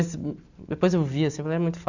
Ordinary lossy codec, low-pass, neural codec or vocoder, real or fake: none; 7.2 kHz; none; real